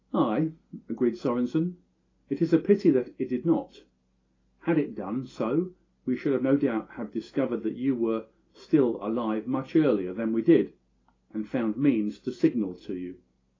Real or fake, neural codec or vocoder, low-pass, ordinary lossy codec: real; none; 7.2 kHz; AAC, 32 kbps